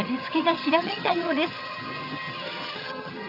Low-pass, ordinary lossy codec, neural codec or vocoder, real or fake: 5.4 kHz; none; vocoder, 22.05 kHz, 80 mel bands, HiFi-GAN; fake